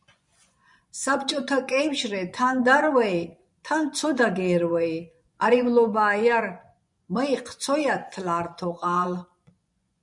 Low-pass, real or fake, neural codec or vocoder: 10.8 kHz; fake; vocoder, 24 kHz, 100 mel bands, Vocos